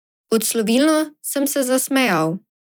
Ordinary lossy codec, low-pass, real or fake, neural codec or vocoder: none; none; fake; vocoder, 44.1 kHz, 128 mel bands every 256 samples, BigVGAN v2